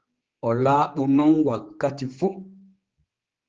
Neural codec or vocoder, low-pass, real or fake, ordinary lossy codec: codec, 16 kHz, 4 kbps, X-Codec, HuBERT features, trained on general audio; 7.2 kHz; fake; Opus, 16 kbps